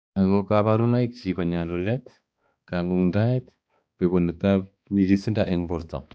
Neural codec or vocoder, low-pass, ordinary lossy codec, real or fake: codec, 16 kHz, 2 kbps, X-Codec, HuBERT features, trained on balanced general audio; none; none; fake